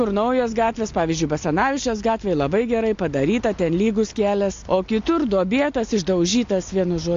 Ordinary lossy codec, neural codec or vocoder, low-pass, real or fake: AAC, 48 kbps; none; 7.2 kHz; real